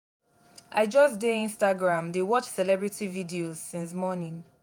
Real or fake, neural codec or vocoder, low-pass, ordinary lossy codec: fake; vocoder, 48 kHz, 128 mel bands, Vocos; none; none